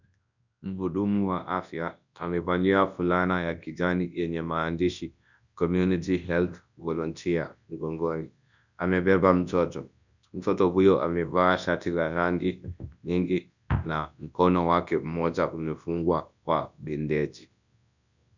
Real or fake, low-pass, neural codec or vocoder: fake; 7.2 kHz; codec, 24 kHz, 0.9 kbps, WavTokenizer, large speech release